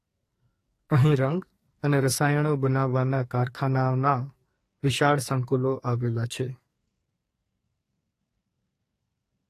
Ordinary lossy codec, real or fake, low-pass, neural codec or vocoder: AAC, 48 kbps; fake; 14.4 kHz; codec, 44.1 kHz, 2.6 kbps, SNAC